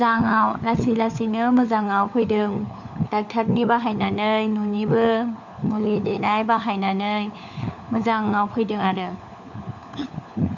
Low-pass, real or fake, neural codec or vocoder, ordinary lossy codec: 7.2 kHz; fake; codec, 16 kHz, 4 kbps, FunCodec, trained on LibriTTS, 50 frames a second; none